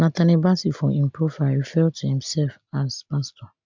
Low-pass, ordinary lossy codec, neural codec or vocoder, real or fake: 7.2 kHz; MP3, 64 kbps; none; real